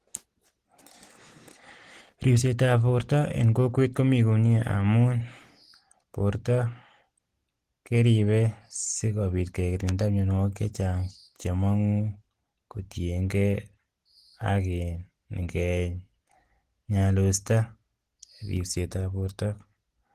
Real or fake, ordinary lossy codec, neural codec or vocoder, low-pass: real; Opus, 16 kbps; none; 14.4 kHz